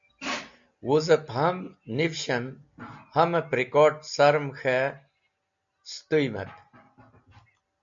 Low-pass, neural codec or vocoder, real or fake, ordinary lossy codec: 7.2 kHz; none; real; MP3, 64 kbps